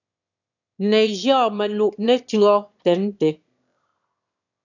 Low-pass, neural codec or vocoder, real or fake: 7.2 kHz; autoencoder, 22.05 kHz, a latent of 192 numbers a frame, VITS, trained on one speaker; fake